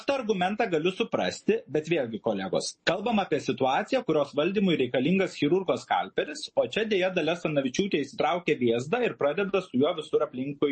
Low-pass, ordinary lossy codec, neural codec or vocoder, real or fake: 10.8 kHz; MP3, 32 kbps; none; real